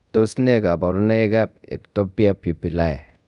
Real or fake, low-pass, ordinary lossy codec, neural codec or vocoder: fake; 10.8 kHz; none; codec, 24 kHz, 0.5 kbps, DualCodec